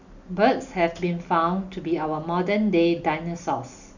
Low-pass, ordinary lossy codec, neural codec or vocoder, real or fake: 7.2 kHz; none; none; real